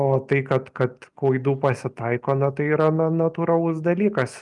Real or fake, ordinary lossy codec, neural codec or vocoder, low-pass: real; Opus, 32 kbps; none; 10.8 kHz